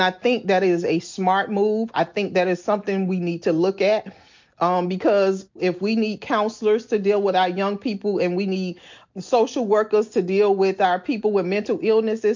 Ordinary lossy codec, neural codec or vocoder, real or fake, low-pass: MP3, 48 kbps; none; real; 7.2 kHz